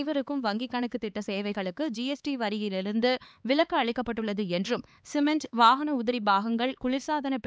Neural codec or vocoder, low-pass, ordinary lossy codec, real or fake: codec, 16 kHz, 4 kbps, X-Codec, HuBERT features, trained on LibriSpeech; none; none; fake